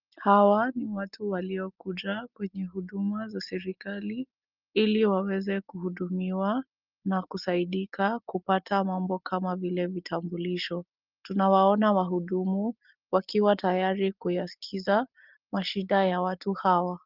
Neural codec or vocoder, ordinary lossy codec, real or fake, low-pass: none; Opus, 32 kbps; real; 5.4 kHz